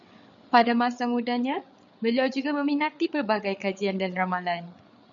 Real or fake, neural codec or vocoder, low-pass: fake; codec, 16 kHz, 8 kbps, FreqCodec, larger model; 7.2 kHz